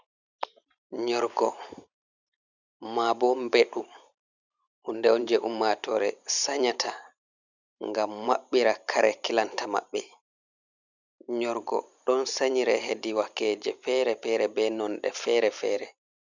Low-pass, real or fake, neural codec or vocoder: 7.2 kHz; fake; vocoder, 44.1 kHz, 128 mel bands every 512 samples, BigVGAN v2